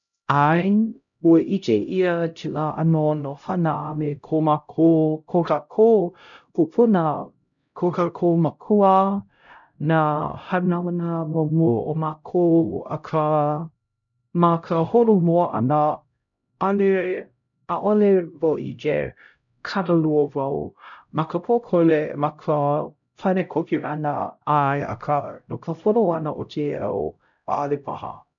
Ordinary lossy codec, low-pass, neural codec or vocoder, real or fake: none; 7.2 kHz; codec, 16 kHz, 0.5 kbps, X-Codec, HuBERT features, trained on LibriSpeech; fake